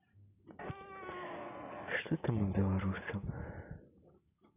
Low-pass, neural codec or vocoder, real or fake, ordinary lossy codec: 3.6 kHz; none; real; none